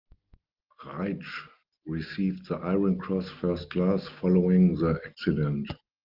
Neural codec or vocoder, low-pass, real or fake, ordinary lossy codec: none; 5.4 kHz; real; Opus, 32 kbps